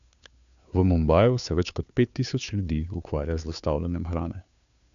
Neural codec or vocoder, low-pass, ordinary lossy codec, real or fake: codec, 16 kHz, 2 kbps, FunCodec, trained on Chinese and English, 25 frames a second; 7.2 kHz; none; fake